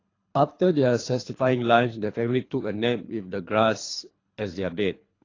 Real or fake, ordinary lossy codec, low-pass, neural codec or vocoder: fake; AAC, 32 kbps; 7.2 kHz; codec, 24 kHz, 3 kbps, HILCodec